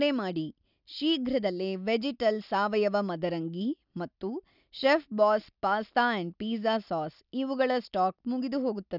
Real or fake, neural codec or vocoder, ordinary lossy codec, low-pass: real; none; none; 5.4 kHz